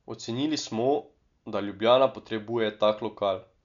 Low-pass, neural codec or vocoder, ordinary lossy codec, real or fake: 7.2 kHz; none; none; real